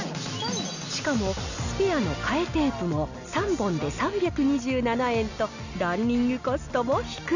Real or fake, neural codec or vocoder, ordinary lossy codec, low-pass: real; none; none; 7.2 kHz